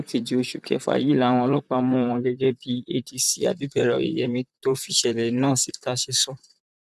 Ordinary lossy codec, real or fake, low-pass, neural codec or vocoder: none; fake; 14.4 kHz; vocoder, 44.1 kHz, 128 mel bands, Pupu-Vocoder